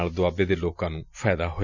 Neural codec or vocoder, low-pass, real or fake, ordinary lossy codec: none; 7.2 kHz; real; none